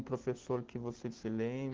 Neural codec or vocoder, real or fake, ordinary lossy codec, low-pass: none; real; Opus, 16 kbps; 7.2 kHz